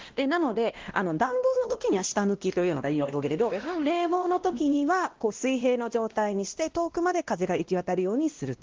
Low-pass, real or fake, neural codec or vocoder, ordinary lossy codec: 7.2 kHz; fake; codec, 16 kHz, 1 kbps, X-Codec, WavLM features, trained on Multilingual LibriSpeech; Opus, 16 kbps